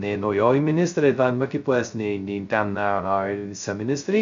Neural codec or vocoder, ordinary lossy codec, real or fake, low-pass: codec, 16 kHz, 0.2 kbps, FocalCodec; MP3, 64 kbps; fake; 7.2 kHz